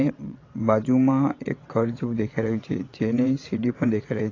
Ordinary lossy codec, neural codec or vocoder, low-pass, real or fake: AAC, 32 kbps; vocoder, 22.05 kHz, 80 mel bands, WaveNeXt; 7.2 kHz; fake